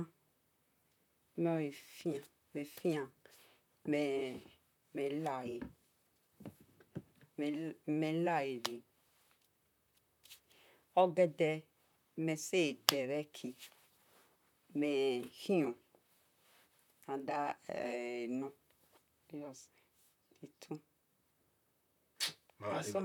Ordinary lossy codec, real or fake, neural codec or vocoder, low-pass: none; fake; vocoder, 44.1 kHz, 128 mel bands, Pupu-Vocoder; 19.8 kHz